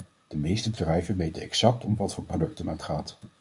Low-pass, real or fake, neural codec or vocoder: 10.8 kHz; fake; codec, 24 kHz, 0.9 kbps, WavTokenizer, medium speech release version 1